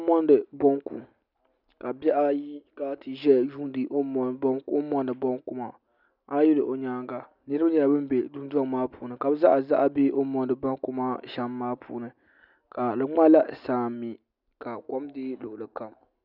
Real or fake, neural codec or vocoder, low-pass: real; none; 5.4 kHz